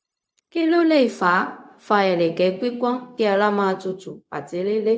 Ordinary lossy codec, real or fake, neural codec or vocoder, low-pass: none; fake; codec, 16 kHz, 0.4 kbps, LongCat-Audio-Codec; none